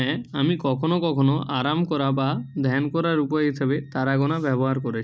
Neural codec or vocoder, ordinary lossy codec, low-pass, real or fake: none; none; none; real